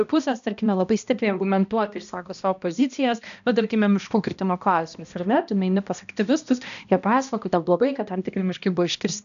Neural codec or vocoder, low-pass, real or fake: codec, 16 kHz, 1 kbps, X-Codec, HuBERT features, trained on balanced general audio; 7.2 kHz; fake